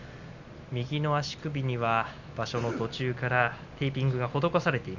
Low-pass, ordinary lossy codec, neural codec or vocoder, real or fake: 7.2 kHz; none; none; real